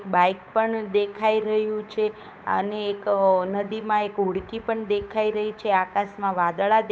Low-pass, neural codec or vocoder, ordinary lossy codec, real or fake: none; codec, 16 kHz, 8 kbps, FunCodec, trained on Chinese and English, 25 frames a second; none; fake